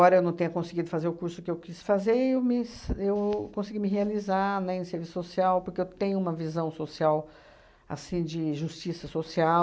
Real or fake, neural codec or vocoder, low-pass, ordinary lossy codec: real; none; none; none